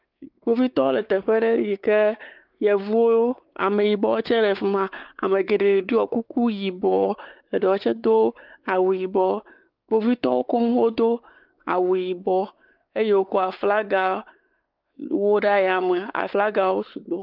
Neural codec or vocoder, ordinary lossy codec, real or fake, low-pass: codec, 16 kHz, 4 kbps, X-Codec, WavLM features, trained on Multilingual LibriSpeech; Opus, 32 kbps; fake; 5.4 kHz